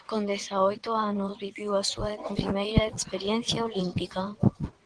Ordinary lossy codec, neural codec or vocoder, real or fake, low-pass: Opus, 16 kbps; vocoder, 22.05 kHz, 80 mel bands, WaveNeXt; fake; 9.9 kHz